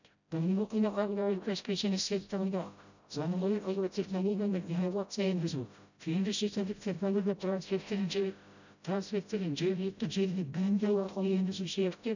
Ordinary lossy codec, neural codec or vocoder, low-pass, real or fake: none; codec, 16 kHz, 0.5 kbps, FreqCodec, smaller model; 7.2 kHz; fake